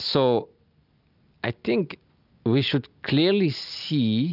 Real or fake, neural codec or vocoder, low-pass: real; none; 5.4 kHz